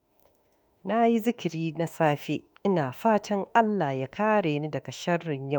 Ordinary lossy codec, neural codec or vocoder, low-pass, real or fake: none; autoencoder, 48 kHz, 32 numbers a frame, DAC-VAE, trained on Japanese speech; none; fake